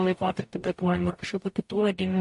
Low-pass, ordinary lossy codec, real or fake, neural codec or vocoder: 14.4 kHz; MP3, 48 kbps; fake; codec, 44.1 kHz, 0.9 kbps, DAC